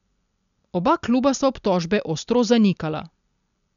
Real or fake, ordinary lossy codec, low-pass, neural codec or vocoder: real; none; 7.2 kHz; none